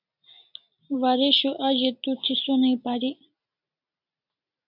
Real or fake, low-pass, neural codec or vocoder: real; 5.4 kHz; none